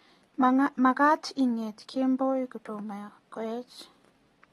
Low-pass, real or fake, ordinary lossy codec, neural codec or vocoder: 19.8 kHz; real; AAC, 32 kbps; none